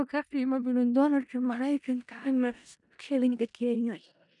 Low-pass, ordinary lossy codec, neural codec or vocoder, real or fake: 10.8 kHz; none; codec, 16 kHz in and 24 kHz out, 0.4 kbps, LongCat-Audio-Codec, four codebook decoder; fake